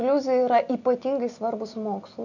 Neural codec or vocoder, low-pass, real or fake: none; 7.2 kHz; real